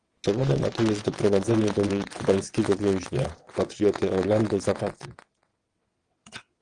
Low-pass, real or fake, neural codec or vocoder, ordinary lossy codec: 9.9 kHz; fake; vocoder, 22.05 kHz, 80 mel bands, WaveNeXt; Opus, 24 kbps